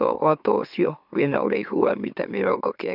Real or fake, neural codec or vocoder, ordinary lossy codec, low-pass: fake; autoencoder, 44.1 kHz, a latent of 192 numbers a frame, MeloTTS; none; 5.4 kHz